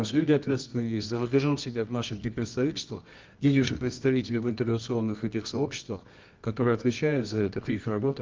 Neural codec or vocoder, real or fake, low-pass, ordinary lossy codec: codec, 24 kHz, 0.9 kbps, WavTokenizer, medium music audio release; fake; 7.2 kHz; Opus, 24 kbps